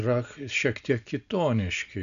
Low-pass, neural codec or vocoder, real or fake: 7.2 kHz; none; real